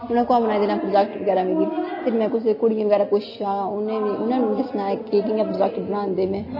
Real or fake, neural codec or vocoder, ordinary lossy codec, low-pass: real; none; MP3, 24 kbps; 5.4 kHz